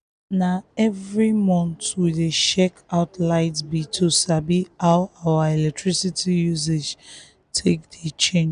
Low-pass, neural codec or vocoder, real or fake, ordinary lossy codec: 9.9 kHz; none; real; none